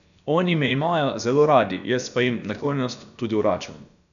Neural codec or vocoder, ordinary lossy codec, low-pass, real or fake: codec, 16 kHz, about 1 kbps, DyCAST, with the encoder's durations; none; 7.2 kHz; fake